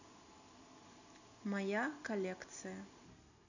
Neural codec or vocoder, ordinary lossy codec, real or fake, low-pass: none; none; real; 7.2 kHz